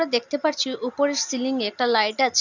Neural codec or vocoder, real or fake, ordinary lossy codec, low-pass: none; real; none; 7.2 kHz